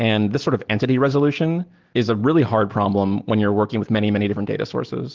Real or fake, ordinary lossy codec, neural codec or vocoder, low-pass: real; Opus, 16 kbps; none; 7.2 kHz